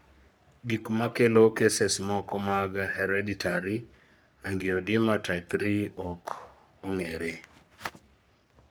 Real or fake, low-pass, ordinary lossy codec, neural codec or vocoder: fake; none; none; codec, 44.1 kHz, 3.4 kbps, Pupu-Codec